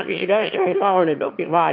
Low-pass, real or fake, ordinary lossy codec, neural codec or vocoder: 5.4 kHz; fake; MP3, 48 kbps; autoencoder, 22.05 kHz, a latent of 192 numbers a frame, VITS, trained on one speaker